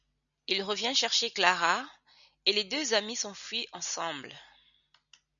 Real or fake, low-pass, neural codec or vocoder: real; 7.2 kHz; none